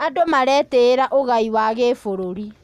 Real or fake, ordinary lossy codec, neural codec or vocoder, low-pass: real; none; none; 14.4 kHz